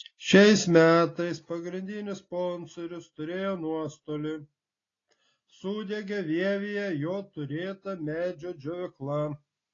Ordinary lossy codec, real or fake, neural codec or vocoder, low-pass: AAC, 32 kbps; real; none; 7.2 kHz